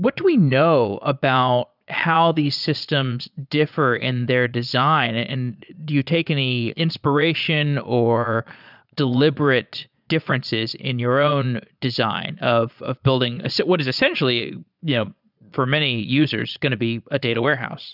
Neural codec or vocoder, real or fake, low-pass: vocoder, 22.05 kHz, 80 mel bands, Vocos; fake; 5.4 kHz